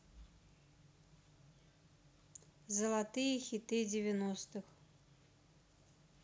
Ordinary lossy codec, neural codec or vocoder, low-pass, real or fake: none; none; none; real